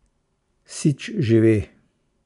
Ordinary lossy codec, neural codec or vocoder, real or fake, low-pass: none; none; real; 10.8 kHz